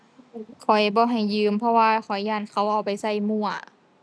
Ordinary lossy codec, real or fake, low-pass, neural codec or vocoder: none; real; none; none